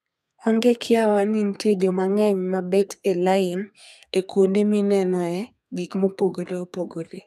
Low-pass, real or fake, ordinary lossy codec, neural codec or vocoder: 14.4 kHz; fake; none; codec, 32 kHz, 1.9 kbps, SNAC